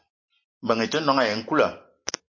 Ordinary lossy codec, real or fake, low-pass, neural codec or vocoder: MP3, 32 kbps; real; 7.2 kHz; none